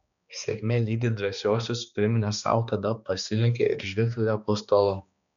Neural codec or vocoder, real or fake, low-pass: codec, 16 kHz, 2 kbps, X-Codec, HuBERT features, trained on balanced general audio; fake; 7.2 kHz